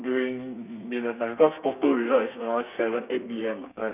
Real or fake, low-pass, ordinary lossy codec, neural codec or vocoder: fake; 3.6 kHz; none; codec, 32 kHz, 1.9 kbps, SNAC